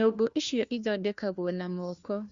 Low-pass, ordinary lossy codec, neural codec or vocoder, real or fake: 7.2 kHz; Opus, 64 kbps; codec, 16 kHz, 1 kbps, FunCodec, trained on LibriTTS, 50 frames a second; fake